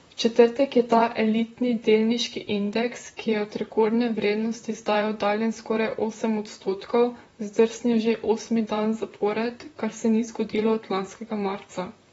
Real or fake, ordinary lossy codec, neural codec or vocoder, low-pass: fake; AAC, 24 kbps; vocoder, 44.1 kHz, 128 mel bands, Pupu-Vocoder; 19.8 kHz